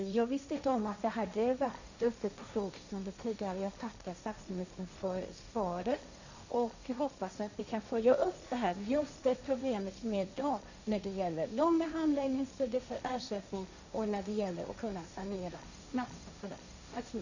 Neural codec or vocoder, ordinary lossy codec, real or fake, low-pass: codec, 16 kHz, 1.1 kbps, Voila-Tokenizer; none; fake; 7.2 kHz